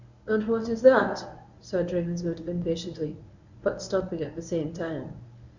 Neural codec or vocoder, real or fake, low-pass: codec, 24 kHz, 0.9 kbps, WavTokenizer, medium speech release version 1; fake; 7.2 kHz